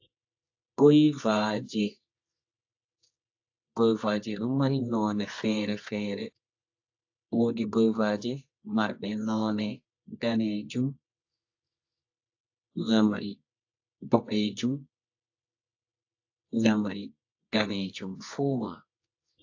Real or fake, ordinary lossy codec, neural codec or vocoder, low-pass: fake; AAC, 48 kbps; codec, 24 kHz, 0.9 kbps, WavTokenizer, medium music audio release; 7.2 kHz